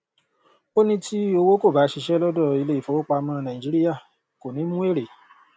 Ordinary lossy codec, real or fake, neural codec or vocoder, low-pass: none; real; none; none